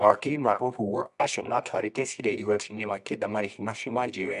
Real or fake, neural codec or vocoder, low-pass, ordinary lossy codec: fake; codec, 24 kHz, 0.9 kbps, WavTokenizer, medium music audio release; 10.8 kHz; none